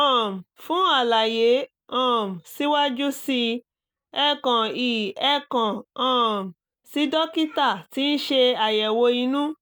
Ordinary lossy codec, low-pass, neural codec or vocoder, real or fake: none; none; none; real